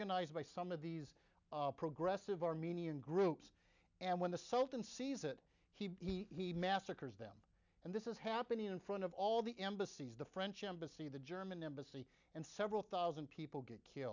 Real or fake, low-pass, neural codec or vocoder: real; 7.2 kHz; none